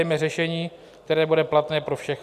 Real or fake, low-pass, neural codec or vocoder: real; 14.4 kHz; none